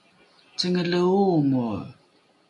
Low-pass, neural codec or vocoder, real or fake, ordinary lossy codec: 10.8 kHz; none; real; MP3, 48 kbps